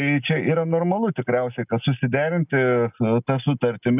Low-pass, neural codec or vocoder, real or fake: 3.6 kHz; none; real